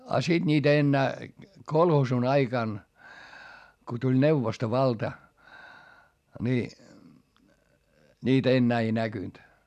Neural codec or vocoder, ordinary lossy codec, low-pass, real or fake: none; none; 14.4 kHz; real